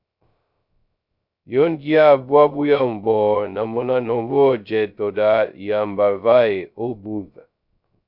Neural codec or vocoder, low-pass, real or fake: codec, 16 kHz, 0.2 kbps, FocalCodec; 5.4 kHz; fake